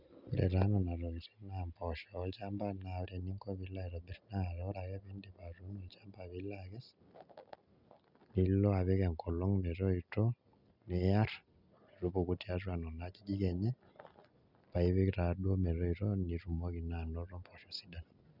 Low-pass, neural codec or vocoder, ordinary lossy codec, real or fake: 5.4 kHz; none; none; real